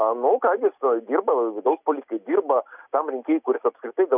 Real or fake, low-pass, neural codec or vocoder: real; 3.6 kHz; none